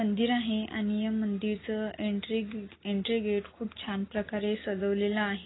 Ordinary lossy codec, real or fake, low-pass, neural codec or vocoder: AAC, 16 kbps; real; 7.2 kHz; none